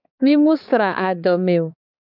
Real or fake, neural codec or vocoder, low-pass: fake; codec, 16 kHz, 4 kbps, X-Codec, HuBERT features, trained on balanced general audio; 5.4 kHz